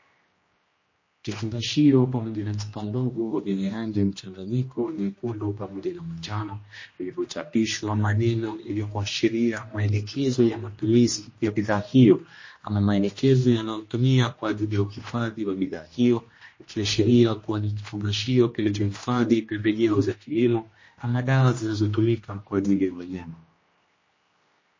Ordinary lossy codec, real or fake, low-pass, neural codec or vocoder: MP3, 32 kbps; fake; 7.2 kHz; codec, 16 kHz, 1 kbps, X-Codec, HuBERT features, trained on general audio